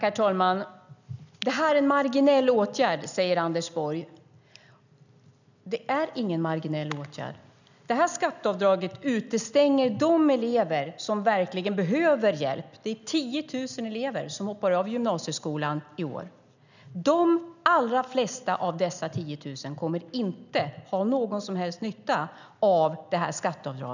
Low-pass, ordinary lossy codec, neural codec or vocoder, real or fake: 7.2 kHz; none; none; real